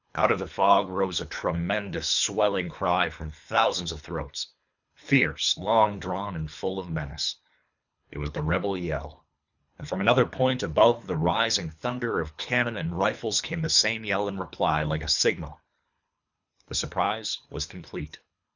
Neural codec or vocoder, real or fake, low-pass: codec, 24 kHz, 3 kbps, HILCodec; fake; 7.2 kHz